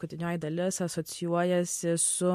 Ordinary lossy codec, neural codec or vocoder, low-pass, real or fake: MP3, 64 kbps; none; 14.4 kHz; real